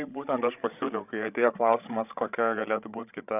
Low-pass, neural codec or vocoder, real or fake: 3.6 kHz; codec, 16 kHz, 16 kbps, FreqCodec, larger model; fake